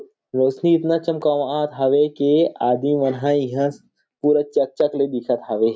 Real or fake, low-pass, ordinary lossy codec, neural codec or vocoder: real; none; none; none